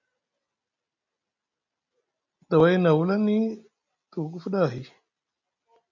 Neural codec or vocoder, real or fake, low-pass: none; real; 7.2 kHz